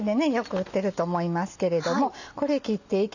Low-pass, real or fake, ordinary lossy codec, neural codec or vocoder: 7.2 kHz; real; none; none